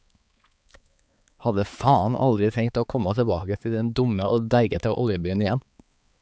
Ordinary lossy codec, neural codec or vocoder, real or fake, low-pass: none; codec, 16 kHz, 4 kbps, X-Codec, HuBERT features, trained on balanced general audio; fake; none